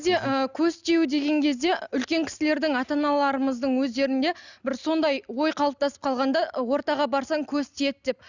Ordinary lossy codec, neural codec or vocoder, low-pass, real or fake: none; none; 7.2 kHz; real